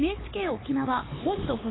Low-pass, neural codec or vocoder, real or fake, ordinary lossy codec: 7.2 kHz; codec, 16 kHz, 4 kbps, X-Codec, HuBERT features, trained on LibriSpeech; fake; AAC, 16 kbps